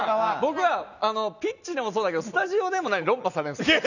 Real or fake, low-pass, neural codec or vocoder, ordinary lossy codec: real; 7.2 kHz; none; none